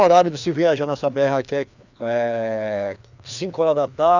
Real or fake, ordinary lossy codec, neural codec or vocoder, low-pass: fake; none; codec, 16 kHz, 2 kbps, FreqCodec, larger model; 7.2 kHz